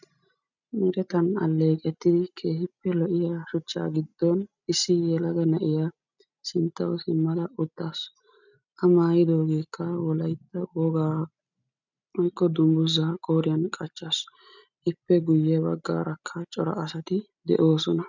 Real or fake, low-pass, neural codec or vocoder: real; 7.2 kHz; none